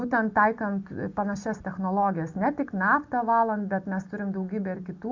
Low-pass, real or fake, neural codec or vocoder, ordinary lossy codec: 7.2 kHz; real; none; MP3, 64 kbps